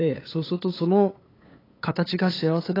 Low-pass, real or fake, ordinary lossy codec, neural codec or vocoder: 5.4 kHz; fake; AAC, 24 kbps; codec, 16 kHz, 8 kbps, FunCodec, trained on LibriTTS, 25 frames a second